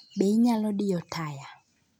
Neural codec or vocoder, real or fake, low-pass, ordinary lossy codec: none; real; 19.8 kHz; none